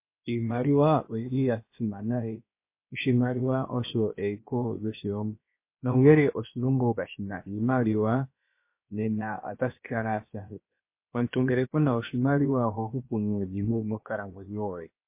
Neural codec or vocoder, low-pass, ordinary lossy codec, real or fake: codec, 16 kHz, about 1 kbps, DyCAST, with the encoder's durations; 3.6 kHz; MP3, 24 kbps; fake